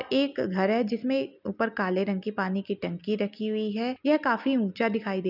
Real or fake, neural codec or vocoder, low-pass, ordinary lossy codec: real; none; 5.4 kHz; none